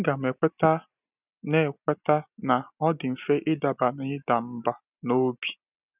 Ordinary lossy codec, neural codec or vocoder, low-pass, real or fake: none; none; 3.6 kHz; real